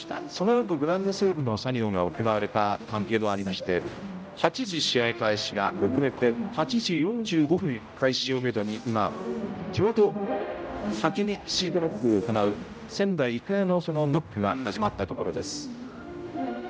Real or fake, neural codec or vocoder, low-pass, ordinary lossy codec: fake; codec, 16 kHz, 0.5 kbps, X-Codec, HuBERT features, trained on general audio; none; none